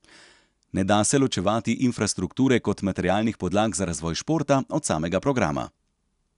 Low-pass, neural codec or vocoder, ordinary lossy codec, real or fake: 10.8 kHz; none; none; real